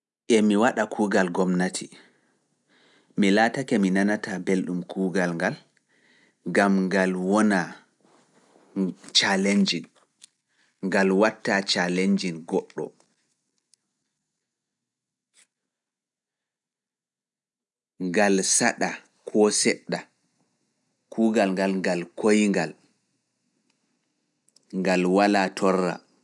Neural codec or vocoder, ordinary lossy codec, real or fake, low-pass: none; none; real; 10.8 kHz